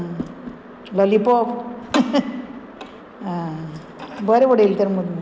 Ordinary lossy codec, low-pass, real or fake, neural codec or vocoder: none; none; real; none